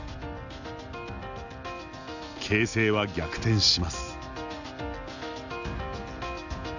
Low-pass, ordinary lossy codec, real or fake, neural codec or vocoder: 7.2 kHz; none; real; none